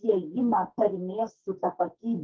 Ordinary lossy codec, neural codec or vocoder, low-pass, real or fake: Opus, 16 kbps; codec, 44.1 kHz, 2.6 kbps, SNAC; 7.2 kHz; fake